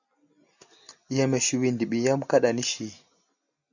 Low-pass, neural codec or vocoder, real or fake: 7.2 kHz; none; real